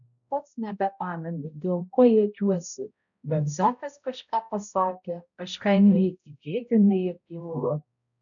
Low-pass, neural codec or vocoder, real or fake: 7.2 kHz; codec, 16 kHz, 0.5 kbps, X-Codec, HuBERT features, trained on balanced general audio; fake